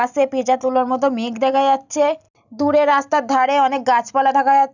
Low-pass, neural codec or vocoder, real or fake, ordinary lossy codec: 7.2 kHz; none; real; none